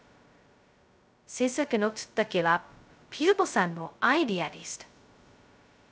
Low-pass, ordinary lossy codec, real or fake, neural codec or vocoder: none; none; fake; codec, 16 kHz, 0.2 kbps, FocalCodec